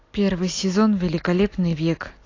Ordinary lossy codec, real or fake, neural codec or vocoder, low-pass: AAC, 32 kbps; real; none; 7.2 kHz